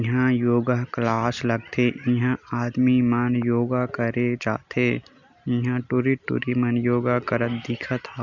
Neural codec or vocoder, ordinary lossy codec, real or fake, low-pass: none; none; real; 7.2 kHz